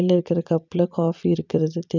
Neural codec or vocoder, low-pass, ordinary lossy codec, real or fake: vocoder, 44.1 kHz, 128 mel bands every 256 samples, BigVGAN v2; 7.2 kHz; none; fake